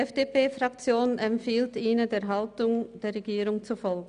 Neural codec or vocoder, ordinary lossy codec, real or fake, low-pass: none; none; real; 9.9 kHz